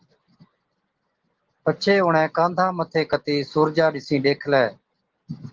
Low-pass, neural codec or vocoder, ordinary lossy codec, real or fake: 7.2 kHz; none; Opus, 16 kbps; real